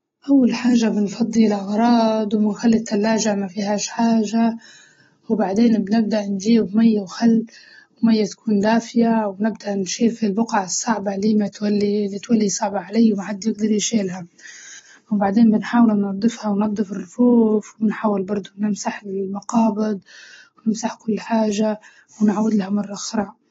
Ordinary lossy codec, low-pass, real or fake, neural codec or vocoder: AAC, 24 kbps; 7.2 kHz; real; none